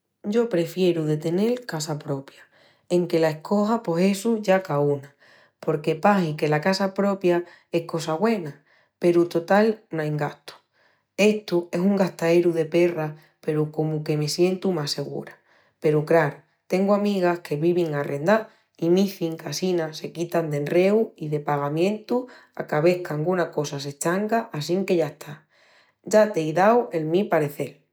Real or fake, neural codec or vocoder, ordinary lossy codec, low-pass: real; none; none; none